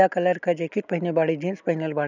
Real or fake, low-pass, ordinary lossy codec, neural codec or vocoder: real; 7.2 kHz; none; none